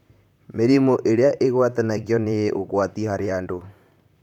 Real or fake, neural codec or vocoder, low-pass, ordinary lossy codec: fake; vocoder, 44.1 kHz, 128 mel bands, Pupu-Vocoder; 19.8 kHz; none